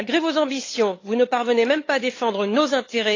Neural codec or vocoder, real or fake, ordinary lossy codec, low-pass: codec, 16 kHz, 8 kbps, FunCodec, trained on LibriTTS, 25 frames a second; fake; AAC, 32 kbps; 7.2 kHz